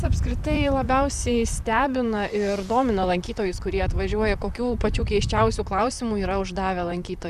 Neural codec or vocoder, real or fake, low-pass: vocoder, 44.1 kHz, 128 mel bands, Pupu-Vocoder; fake; 14.4 kHz